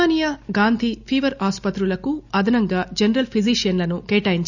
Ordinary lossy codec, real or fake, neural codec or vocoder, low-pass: none; real; none; 7.2 kHz